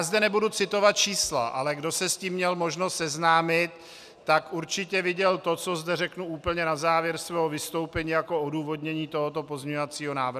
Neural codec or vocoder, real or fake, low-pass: none; real; 14.4 kHz